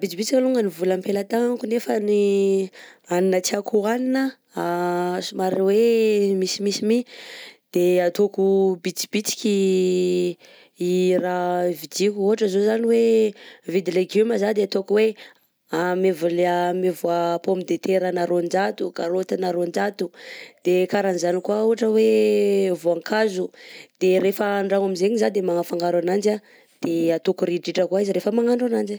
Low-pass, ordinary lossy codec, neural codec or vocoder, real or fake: none; none; none; real